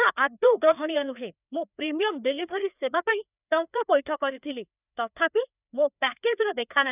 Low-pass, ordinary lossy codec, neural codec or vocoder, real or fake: 3.6 kHz; none; codec, 16 kHz, 2 kbps, FreqCodec, larger model; fake